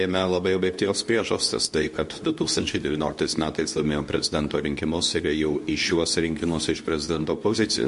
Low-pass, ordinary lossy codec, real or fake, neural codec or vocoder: 10.8 kHz; MP3, 48 kbps; fake; codec, 24 kHz, 0.9 kbps, WavTokenizer, medium speech release version 2